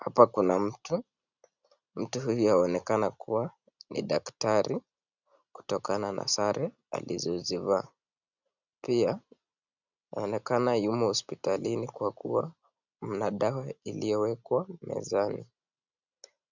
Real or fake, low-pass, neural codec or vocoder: real; 7.2 kHz; none